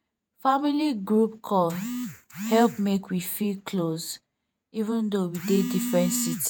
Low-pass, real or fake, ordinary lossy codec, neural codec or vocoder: none; fake; none; vocoder, 48 kHz, 128 mel bands, Vocos